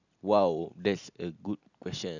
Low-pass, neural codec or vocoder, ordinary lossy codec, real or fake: 7.2 kHz; none; none; real